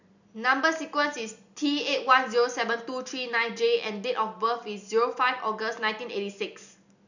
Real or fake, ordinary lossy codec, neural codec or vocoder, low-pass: real; none; none; 7.2 kHz